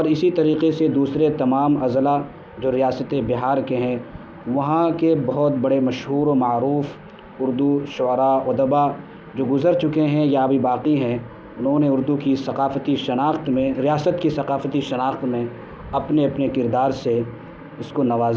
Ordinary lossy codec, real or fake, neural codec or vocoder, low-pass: none; real; none; none